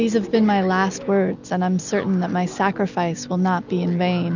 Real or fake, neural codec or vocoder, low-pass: real; none; 7.2 kHz